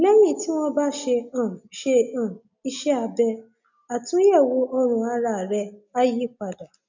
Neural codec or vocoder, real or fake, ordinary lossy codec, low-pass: none; real; none; 7.2 kHz